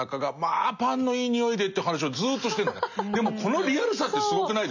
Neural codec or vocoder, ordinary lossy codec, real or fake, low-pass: none; none; real; 7.2 kHz